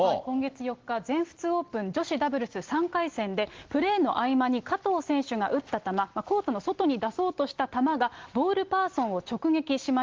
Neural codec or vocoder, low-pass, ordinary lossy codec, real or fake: none; 7.2 kHz; Opus, 16 kbps; real